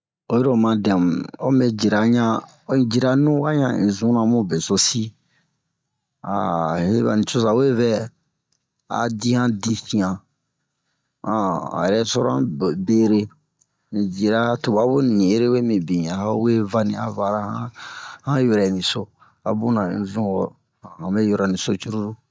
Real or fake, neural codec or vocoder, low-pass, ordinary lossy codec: real; none; none; none